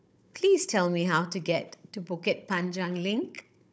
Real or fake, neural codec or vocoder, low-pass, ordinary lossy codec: fake; codec, 16 kHz, 16 kbps, FunCodec, trained on Chinese and English, 50 frames a second; none; none